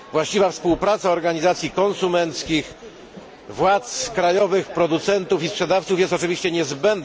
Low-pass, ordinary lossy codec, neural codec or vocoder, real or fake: none; none; none; real